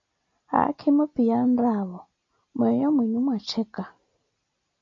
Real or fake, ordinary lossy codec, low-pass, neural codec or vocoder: real; AAC, 48 kbps; 7.2 kHz; none